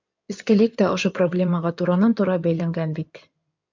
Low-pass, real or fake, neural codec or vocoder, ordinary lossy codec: 7.2 kHz; fake; codec, 16 kHz in and 24 kHz out, 2.2 kbps, FireRedTTS-2 codec; MP3, 64 kbps